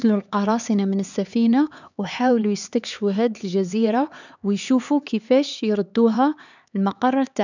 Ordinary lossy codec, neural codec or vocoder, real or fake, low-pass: none; codec, 16 kHz, 4 kbps, X-Codec, HuBERT features, trained on LibriSpeech; fake; 7.2 kHz